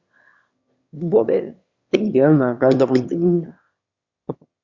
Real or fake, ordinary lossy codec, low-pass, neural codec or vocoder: fake; Opus, 64 kbps; 7.2 kHz; autoencoder, 22.05 kHz, a latent of 192 numbers a frame, VITS, trained on one speaker